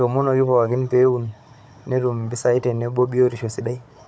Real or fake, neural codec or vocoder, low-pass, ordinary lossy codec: fake; codec, 16 kHz, 16 kbps, FunCodec, trained on Chinese and English, 50 frames a second; none; none